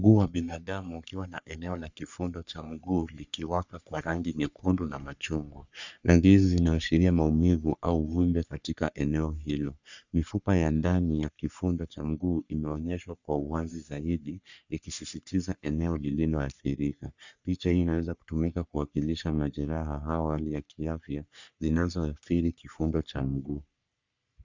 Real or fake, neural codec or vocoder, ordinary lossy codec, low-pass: fake; codec, 44.1 kHz, 3.4 kbps, Pupu-Codec; Opus, 64 kbps; 7.2 kHz